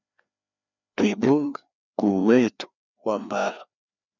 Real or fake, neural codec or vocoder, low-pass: fake; codec, 16 kHz, 2 kbps, FreqCodec, larger model; 7.2 kHz